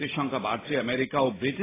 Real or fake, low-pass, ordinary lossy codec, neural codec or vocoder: real; 3.6 kHz; AAC, 16 kbps; none